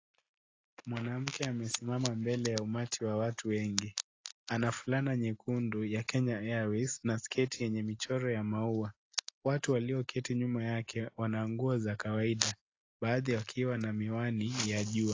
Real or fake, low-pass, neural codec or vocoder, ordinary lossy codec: real; 7.2 kHz; none; AAC, 32 kbps